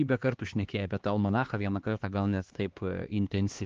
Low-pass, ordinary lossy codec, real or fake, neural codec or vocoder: 7.2 kHz; Opus, 16 kbps; fake; codec, 16 kHz, 2 kbps, X-Codec, HuBERT features, trained on LibriSpeech